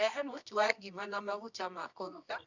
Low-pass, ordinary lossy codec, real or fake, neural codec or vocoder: 7.2 kHz; none; fake; codec, 24 kHz, 0.9 kbps, WavTokenizer, medium music audio release